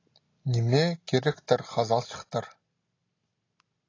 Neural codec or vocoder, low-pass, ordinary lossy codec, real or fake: none; 7.2 kHz; AAC, 32 kbps; real